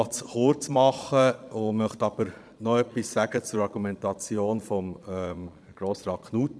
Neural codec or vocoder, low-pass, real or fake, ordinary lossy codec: vocoder, 22.05 kHz, 80 mel bands, Vocos; none; fake; none